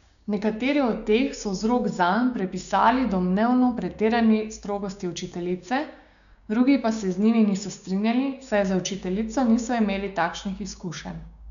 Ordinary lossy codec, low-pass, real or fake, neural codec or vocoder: none; 7.2 kHz; fake; codec, 16 kHz, 6 kbps, DAC